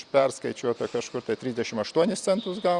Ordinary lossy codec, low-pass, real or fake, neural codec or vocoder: Opus, 64 kbps; 10.8 kHz; fake; vocoder, 44.1 kHz, 128 mel bands every 256 samples, BigVGAN v2